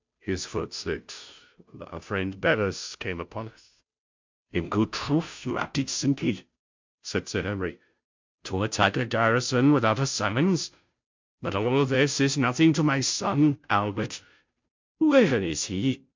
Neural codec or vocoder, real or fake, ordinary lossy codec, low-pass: codec, 16 kHz, 0.5 kbps, FunCodec, trained on Chinese and English, 25 frames a second; fake; MP3, 64 kbps; 7.2 kHz